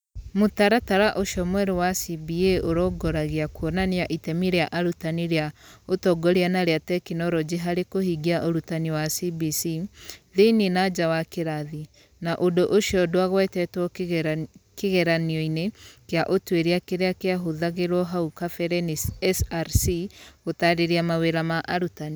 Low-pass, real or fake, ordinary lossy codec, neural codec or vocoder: none; real; none; none